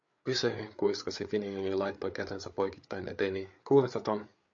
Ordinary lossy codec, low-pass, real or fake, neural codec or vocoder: MP3, 48 kbps; 7.2 kHz; fake; codec, 16 kHz, 8 kbps, FreqCodec, larger model